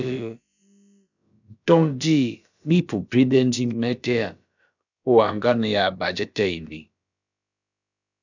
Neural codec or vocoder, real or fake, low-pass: codec, 16 kHz, about 1 kbps, DyCAST, with the encoder's durations; fake; 7.2 kHz